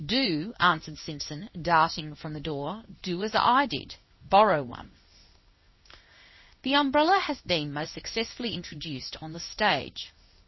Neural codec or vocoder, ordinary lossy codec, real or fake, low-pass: codec, 16 kHz in and 24 kHz out, 1 kbps, XY-Tokenizer; MP3, 24 kbps; fake; 7.2 kHz